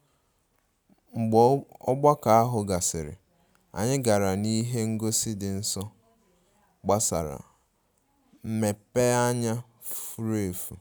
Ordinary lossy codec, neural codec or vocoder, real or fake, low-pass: none; none; real; none